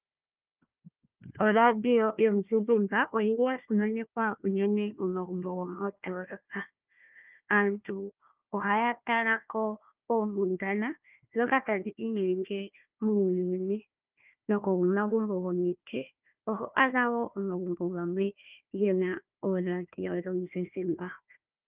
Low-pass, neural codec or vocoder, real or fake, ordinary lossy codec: 3.6 kHz; codec, 16 kHz, 1 kbps, FunCodec, trained on Chinese and English, 50 frames a second; fake; Opus, 24 kbps